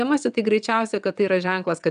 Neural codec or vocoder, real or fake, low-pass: vocoder, 22.05 kHz, 80 mel bands, WaveNeXt; fake; 9.9 kHz